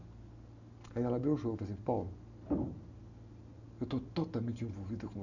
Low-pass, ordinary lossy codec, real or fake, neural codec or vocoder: 7.2 kHz; none; real; none